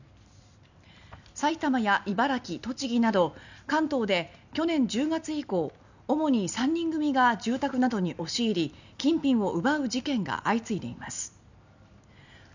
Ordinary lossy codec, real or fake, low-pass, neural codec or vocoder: none; real; 7.2 kHz; none